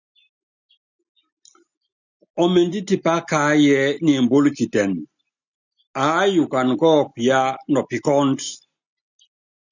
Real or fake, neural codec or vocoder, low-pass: real; none; 7.2 kHz